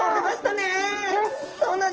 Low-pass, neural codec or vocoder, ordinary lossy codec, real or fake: 7.2 kHz; none; Opus, 16 kbps; real